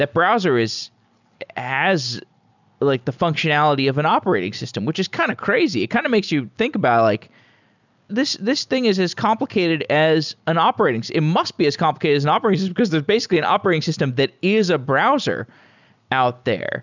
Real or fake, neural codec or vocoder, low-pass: real; none; 7.2 kHz